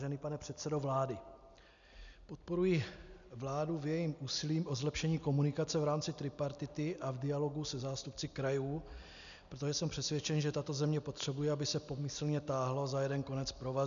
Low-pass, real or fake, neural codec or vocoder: 7.2 kHz; real; none